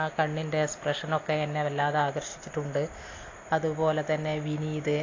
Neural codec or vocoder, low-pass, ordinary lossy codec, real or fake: none; 7.2 kHz; AAC, 32 kbps; real